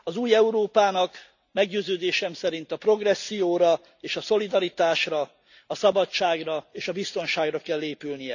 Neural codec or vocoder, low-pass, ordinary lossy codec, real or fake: none; 7.2 kHz; none; real